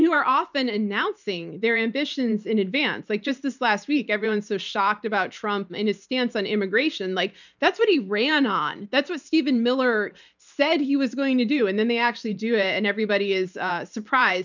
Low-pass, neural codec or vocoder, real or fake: 7.2 kHz; vocoder, 44.1 kHz, 128 mel bands every 512 samples, BigVGAN v2; fake